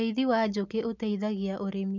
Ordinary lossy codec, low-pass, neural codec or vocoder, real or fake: none; 7.2 kHz; none; real